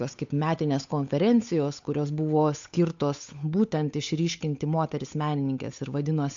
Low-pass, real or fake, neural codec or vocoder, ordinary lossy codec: 7.2 kHz; fake; codec, 16 kHz, 8 kbps, FunCodec, trained on Chinese and English, 25 frames a second; MP3, 64 kbps